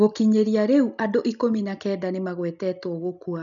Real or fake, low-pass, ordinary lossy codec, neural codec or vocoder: real; 7.2 kHz; none; none